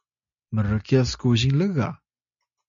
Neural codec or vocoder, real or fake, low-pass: none; real; 7.2 kHz